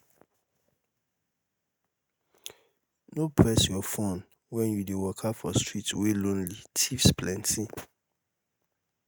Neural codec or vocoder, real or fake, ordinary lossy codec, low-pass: none; real; none; none